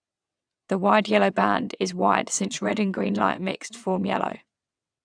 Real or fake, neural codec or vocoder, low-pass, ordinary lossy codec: fake; vocoder, 22.05 kHz, 80 mel bands, WaveNeXt; 9.9 kHz; none